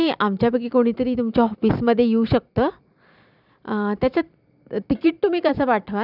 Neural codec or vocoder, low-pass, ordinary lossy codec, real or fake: vocoder, 44.1 kHz, 128 mel bands every 256 samples, BigVGAN v2; 5.4 kHz; none; fake